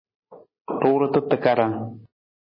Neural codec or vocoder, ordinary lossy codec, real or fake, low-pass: none; MP3, 24 kbps; real; 5.4 kHz